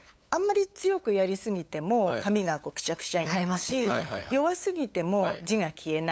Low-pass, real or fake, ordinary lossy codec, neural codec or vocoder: none; fake; none; codec, 16 kHz, 8 kbps, FunCodec, trained on LibriTTS, 25 frames a second